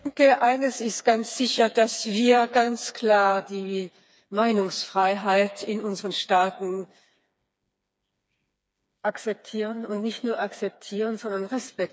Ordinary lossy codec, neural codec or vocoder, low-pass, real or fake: none; codec, 16 kHz, 4 kbps, FreqCodec, smaller model; none; fake